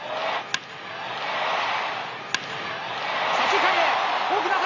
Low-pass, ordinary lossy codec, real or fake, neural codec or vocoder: 7.2 kHz; none; real; none